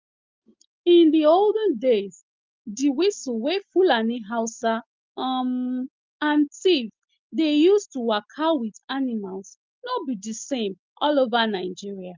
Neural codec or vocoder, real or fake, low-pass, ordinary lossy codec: none; real; 7.2 kHz; Opus, 24 kbps